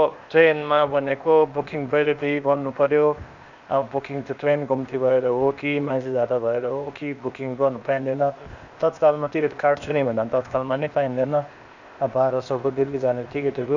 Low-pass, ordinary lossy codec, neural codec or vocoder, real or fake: 7.2 kHz; none; codec, 16 kHz, 0.8 kbps, ZipCodec; fake